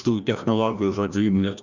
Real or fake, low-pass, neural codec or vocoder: fake; 7.2 kHz; codec, 16 kHz, 1 kbps, FreqCodec, larger model